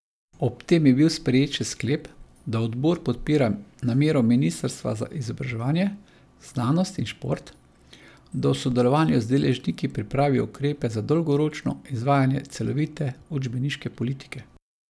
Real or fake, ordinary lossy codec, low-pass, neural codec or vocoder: real; none; none; none